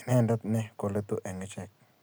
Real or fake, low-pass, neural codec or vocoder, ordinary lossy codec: real; none; none; none